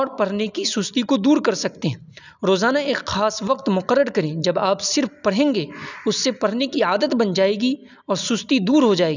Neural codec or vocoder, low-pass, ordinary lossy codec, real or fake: none; 7.2 kHz; none; real